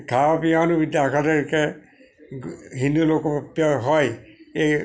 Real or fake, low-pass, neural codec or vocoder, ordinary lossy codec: real; none; none; none